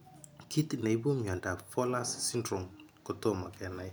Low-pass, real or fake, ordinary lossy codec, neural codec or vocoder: none; real; none; none